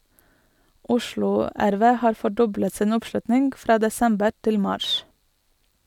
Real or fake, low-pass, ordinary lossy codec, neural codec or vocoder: real; 19.8 kHz; none; none